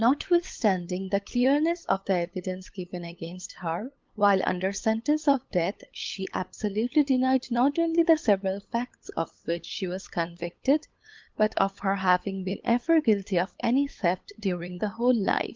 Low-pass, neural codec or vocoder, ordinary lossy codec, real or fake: 7.2 kHz; codec, 16 kHz, 8 kbps, FunCodec, trained on LibriTTS, 25 frames a second; Opus, 32 kbps; fake